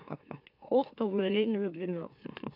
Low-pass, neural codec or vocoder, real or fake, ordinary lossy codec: 5.4 kHz; autoencoder, 44.1 kHz, a latent of 192 numbers a frame, MeloTTS; fake; AAC, 48 kbps